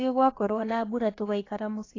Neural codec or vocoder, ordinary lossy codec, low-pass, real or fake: codec, 16 kHz, about 1 kbps, DyCAST, with the encoder's durations; AAC, 32 kbps; 7.2 kHz; fake